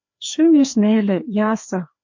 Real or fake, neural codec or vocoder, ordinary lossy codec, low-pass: fake; codec, 16 kHz, 2 kbps, FreqCodec, larger model; MP3, 48 kbps; 7.2 kHz